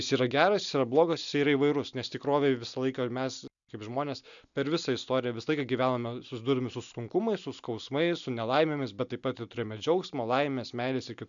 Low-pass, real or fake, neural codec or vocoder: 7.2 kHz; real; none